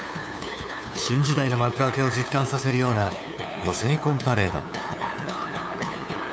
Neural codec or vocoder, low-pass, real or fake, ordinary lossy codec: codec, 16 kHz, 2 kbps, FunCodec, trained on LibriTTS, 25 frames a second; none; fake; none